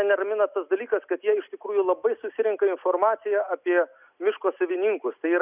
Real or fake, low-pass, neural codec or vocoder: real; 3.6 kHz; none